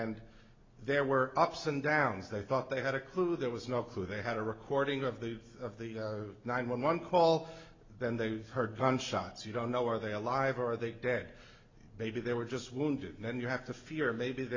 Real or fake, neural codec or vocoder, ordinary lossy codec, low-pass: real; none; MP3, 64 kbps; 7.2 kHz